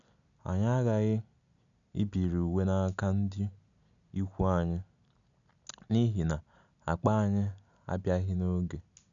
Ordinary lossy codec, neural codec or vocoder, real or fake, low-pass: none; none; real; 7.2 kHz